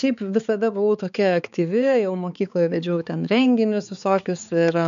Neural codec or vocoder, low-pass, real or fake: codec, 16 kHz, 4 kbps, X-Codec, HuBERT features, trained on balanced general audio; 7.2 kHz; fake